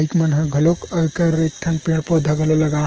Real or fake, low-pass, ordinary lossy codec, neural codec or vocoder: fake; 7.2 kHz; Opus, 16 kbps; codec, 16 kHz, 6 kbps, DAC